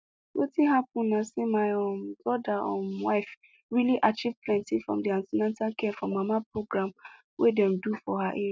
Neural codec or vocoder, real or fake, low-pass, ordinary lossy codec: none; real; none; none